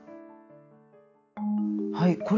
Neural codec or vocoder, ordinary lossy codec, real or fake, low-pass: none; none; real; 7.2 kHz